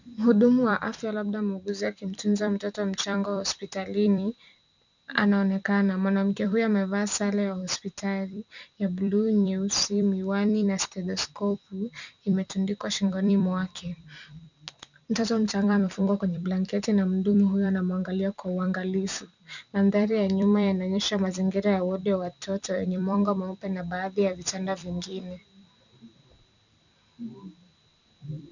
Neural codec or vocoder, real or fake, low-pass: vocoder, 44.1 kHz, 128 mel bands every 256 samples, BigVGAN v2; fake; 7.2 kHz